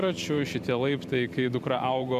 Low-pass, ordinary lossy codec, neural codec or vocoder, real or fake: 14.4 kHz; MP3, 96 kbps; vocoder, 44.1 kHz, 128 mel bands every 512 samples, BigVGAN v2; fake